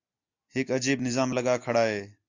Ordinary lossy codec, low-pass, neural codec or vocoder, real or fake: AAC, 48 kbps; 7.2 kHz; none; real